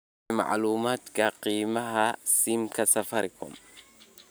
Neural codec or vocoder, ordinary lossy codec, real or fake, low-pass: none; none; real; none